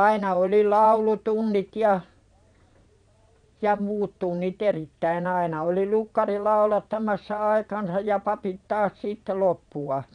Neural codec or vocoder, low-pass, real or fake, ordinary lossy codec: vocoder, 22.05 kHz, 80 mel bands, Vocos; 9.9 kHz; fake; none